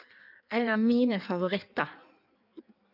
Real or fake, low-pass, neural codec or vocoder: fake; 5.4 kHz; codec, 16 kHz in and 24 kHz out, 1.1 kbps, FireRedTTS-2 codec